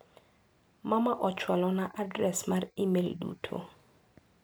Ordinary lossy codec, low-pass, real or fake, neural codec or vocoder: none; none; real; none